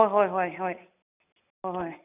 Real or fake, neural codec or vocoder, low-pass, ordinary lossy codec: real; none; 3.6 kHz; none